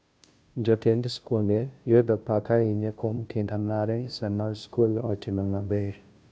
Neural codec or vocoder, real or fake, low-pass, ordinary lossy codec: codec, 16 kHz, 0.5 kbps, FunCodec, trained on Chinese and English, 25 frames a second; fake; none; none